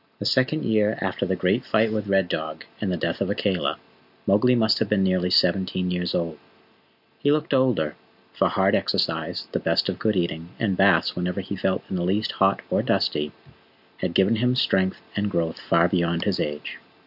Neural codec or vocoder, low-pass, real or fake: none; 5.4 kHz; real